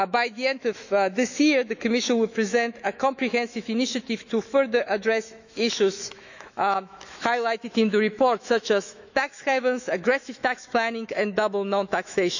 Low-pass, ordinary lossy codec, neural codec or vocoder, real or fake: 7.2 kHz; none; autoencoder, 48 kHz, 128 numbers a frame, DAC-VAE, trained on Japanese speech; fake